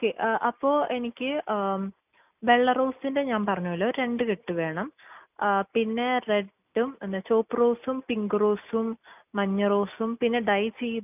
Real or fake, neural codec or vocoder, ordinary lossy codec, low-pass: real; none; none; 3.6 kHz